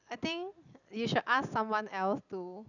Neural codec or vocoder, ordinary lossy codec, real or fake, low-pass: none; none; real; 7.2 kHz